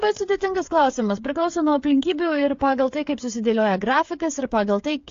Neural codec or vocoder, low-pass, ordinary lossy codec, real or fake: codec, 16 kHz, 8 kbps, FreqCodec, smaller model; 7.2 kHz; AAC, 48 kbps; fake